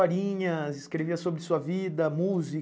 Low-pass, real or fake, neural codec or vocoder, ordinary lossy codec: none; real; none; none